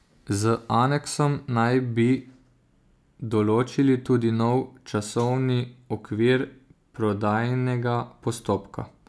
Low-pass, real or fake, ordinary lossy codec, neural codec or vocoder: none; real; none; none